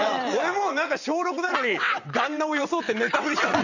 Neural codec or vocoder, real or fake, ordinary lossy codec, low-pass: vocoder, 22.05 kHz, 80 mel bands, WaveNeXt; fake; none; 7.2 kHz